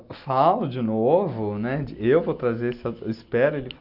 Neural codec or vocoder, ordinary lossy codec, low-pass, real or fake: none; none; 5.4 kHz; real